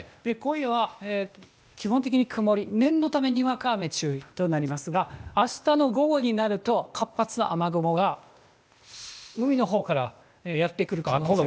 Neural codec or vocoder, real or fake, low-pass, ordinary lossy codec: codec, 16 kHz, 0.8 kbps, ZipCodec; fake; none; none